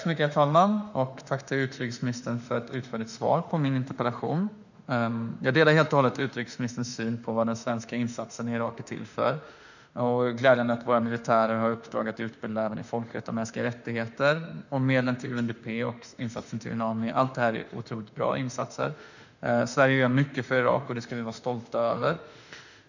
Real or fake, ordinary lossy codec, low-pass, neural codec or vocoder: fake; none; 7.2 kHz; autoencoder, 48 kHz, 32 numbers a frame, DAC-VAE, trained on Japanese speech